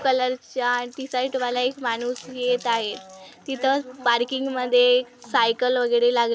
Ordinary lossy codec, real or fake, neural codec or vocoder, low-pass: none; real; none; none